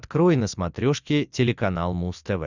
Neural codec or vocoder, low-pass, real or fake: none; 7.2 kHz; real